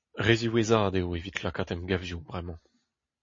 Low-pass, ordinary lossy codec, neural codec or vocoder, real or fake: 7.2 kHz; MP3, 32 kbps; none; real